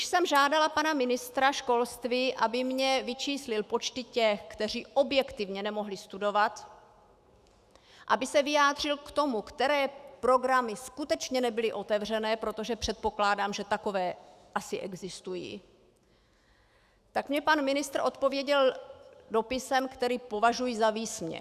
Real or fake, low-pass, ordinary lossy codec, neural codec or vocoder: fake; 14.4 kHz; Opus, 64 kbps; autoencoder, 48 kHz, 128 numbers a frame, DAC-VAE, trained on Japanese speech